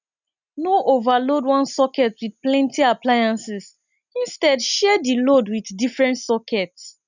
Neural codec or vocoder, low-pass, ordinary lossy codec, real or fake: none; 7.2 kHz; none; real